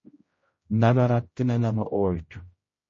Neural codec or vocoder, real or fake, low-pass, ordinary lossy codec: codec, 16 kHz, 0.5 kbps, X-Codec, HuBERT features, trained on general audio; fake; 7.2 kHz; MP3, 32 kbps